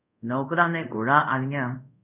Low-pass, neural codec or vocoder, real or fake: 3.6 kHz; codec, 24 kHz, 0.5 kbps, DualCodec; fake